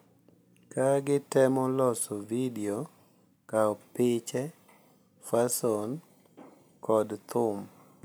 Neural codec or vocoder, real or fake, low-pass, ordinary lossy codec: none; real; none; none